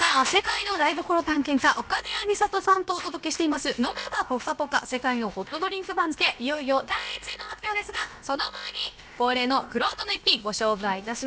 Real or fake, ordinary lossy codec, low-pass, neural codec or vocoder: fake; none; none; codec, 16 kHz, about 1 kbps, DyCAST, with the encoder's durations